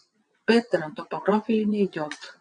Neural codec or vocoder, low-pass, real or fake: vocoder, 22.05 kHz, 80 mel bands, WaveNeXt; 9.9 kHz; fake